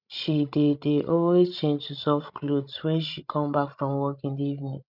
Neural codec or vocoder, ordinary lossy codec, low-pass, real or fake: codec, 16 kHz, 16 kbps, FreqCodec, larger model; none; 5.4 kHz; fake